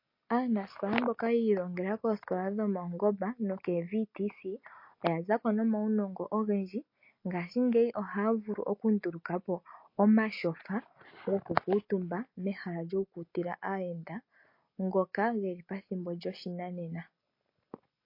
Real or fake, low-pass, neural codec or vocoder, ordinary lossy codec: real; 5.4 kHz; none; MP3, 32 kbps